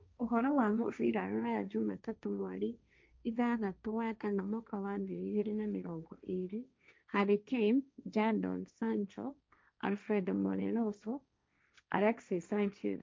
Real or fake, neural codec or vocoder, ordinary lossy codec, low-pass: fake; codec, 16 kHz, 1.1 kbps, Voila-Tokenizer; none; none